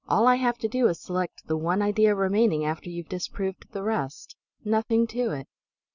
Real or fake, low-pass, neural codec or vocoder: real; 7.2 kHz; none